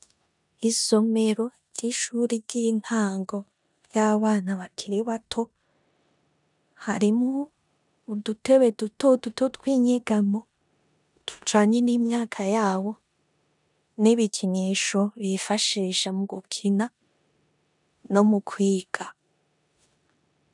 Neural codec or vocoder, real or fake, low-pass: codec, 16 kHz in and 24 kHz out, 0.9 kbps, LongCat-Audio-Codec, four codebook decoder; fake; 10.8 kHz